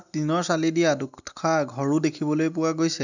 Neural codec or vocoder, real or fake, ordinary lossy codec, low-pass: none; real; none; 7.2 kHz